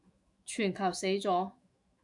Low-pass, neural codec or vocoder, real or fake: 10.8 kHz; autoencoder, 48 kHz, 128 numbers a frame, DAC-VAE, trained on Japanese speech; fake